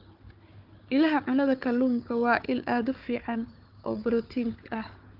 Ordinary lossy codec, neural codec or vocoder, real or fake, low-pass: Opus, 24 kbps; codec, 16 kHz, 4 kbps, FunCodec, trained on Chinese and English, 50 frames a second; fake; 5.4 kHz